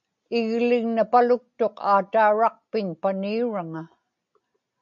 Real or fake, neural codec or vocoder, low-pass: real; none; 7.2 kHz